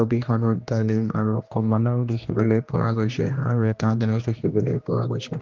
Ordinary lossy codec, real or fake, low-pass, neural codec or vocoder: Opus, 32 kbps; fake; 7.2 kHz; codec, 16 kHz, 1 kbps, X-Codec, HuBERT features, trained on general audio